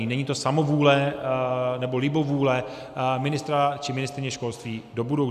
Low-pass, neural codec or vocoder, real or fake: 14.4 kHz; none; real